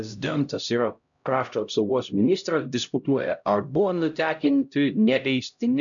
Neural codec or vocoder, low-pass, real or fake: codec, 16 kHz, 0.5 kbps, X-Codec, HuBERT features, trained on LibriSpeech; 7.2 kHz; fake